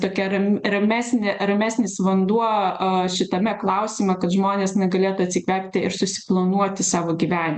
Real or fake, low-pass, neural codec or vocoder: real; 10.8 kHz; none